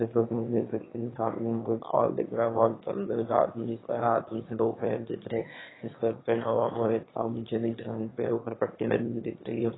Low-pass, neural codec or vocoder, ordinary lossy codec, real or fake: 7.2 kHz; autoencoder, 22.05 kHz, a latent of 192 numbers a frame, VITS, trained on one speaker; AAC, 16 kbps; fake